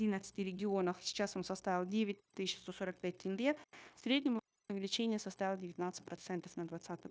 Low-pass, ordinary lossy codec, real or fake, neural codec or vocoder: none; none; fake; codec, 16 kHz, 0.9 kbps, LongCat-Audio-Codec